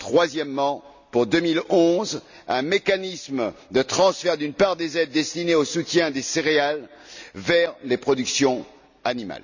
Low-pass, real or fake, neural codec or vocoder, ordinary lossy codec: 7.2 kHz; real; none; none